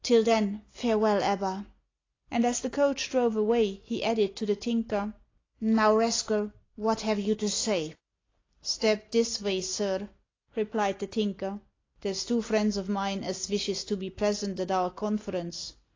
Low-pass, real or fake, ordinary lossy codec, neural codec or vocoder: 7.2 kHz; real; AAC, 32 kbps; none